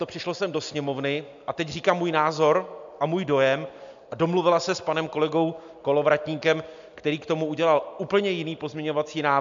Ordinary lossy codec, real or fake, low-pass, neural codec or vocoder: MP3, 64 kbps; real; 7.2 kHz; none